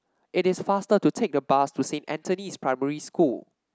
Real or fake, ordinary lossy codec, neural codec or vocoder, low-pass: real; none; none; none